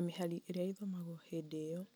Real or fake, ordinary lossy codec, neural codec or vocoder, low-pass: real; none; none; none